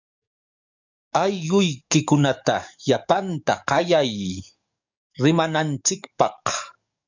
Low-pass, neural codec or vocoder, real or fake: 7.2 kHz; codec, 44.1 kHz, 7.8 kbps, DAC; fake